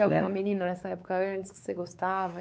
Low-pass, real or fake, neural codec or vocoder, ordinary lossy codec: none; fake; codec, 16 kHz, 4 kbps, X-Codec, WavLM features, trained on Multilingual LibriSpeech; none